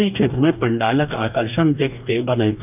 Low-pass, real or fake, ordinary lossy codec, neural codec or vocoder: 3.6 kHz; fake; none; codec, 44.1 kHz, 2.6 kbps, DAC